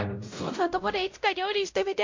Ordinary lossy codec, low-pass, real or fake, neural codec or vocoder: MP3, 48 kbps; 7.2 kHz; fake; codec, 16 kHz, 0.5 kbps, X-Codec, WavLM features, trained on Multilingual LibriSpeech